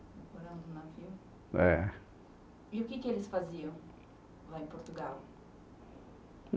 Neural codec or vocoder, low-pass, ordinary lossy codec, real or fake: none; none; none; real